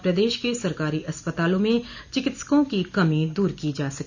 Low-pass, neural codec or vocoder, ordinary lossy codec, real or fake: 7.2 kHz; none; none; real